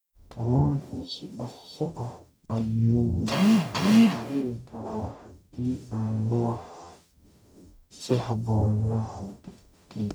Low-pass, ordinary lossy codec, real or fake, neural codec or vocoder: none; none; fake; codec, 44.1 kHz, 0.9 kbps, DAC